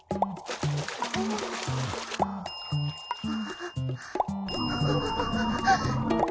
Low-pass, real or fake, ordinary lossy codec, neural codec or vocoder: none; real; none; none